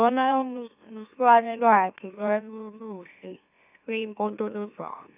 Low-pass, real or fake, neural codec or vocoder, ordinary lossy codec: 3.6 kHz; fake; autoencoder, 44.1 kHz, a latent of 192 numbers a frame, MeloTTS; none